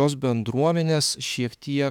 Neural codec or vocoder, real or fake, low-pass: autoencoder, 48 kHz, 32 numbers a frame, DAC-VAE, trained on Japanese speech; fake; 19.8 kHz